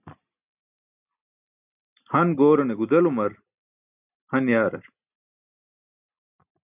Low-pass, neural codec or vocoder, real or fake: 3.6 kHz; none; real